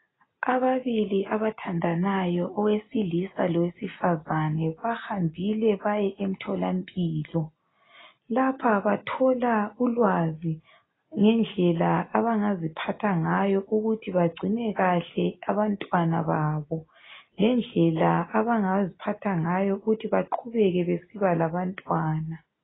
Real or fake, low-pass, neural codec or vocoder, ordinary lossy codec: real; 7.2 kHz; none; AAC, 16 kbps